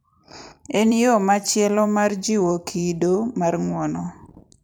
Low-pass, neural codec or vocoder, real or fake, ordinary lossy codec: none; vocoder, 44.1 kHz, 128 mel bands every 256 samples, BigVGAN v2; fake; none